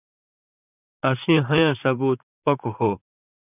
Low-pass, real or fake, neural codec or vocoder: 3.6 kHz; fake; vocoder, 44.1 kHz, 128 mel bands, Pupu-Vocoder